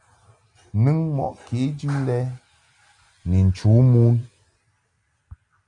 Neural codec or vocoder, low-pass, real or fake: none; 10.8 kHz; real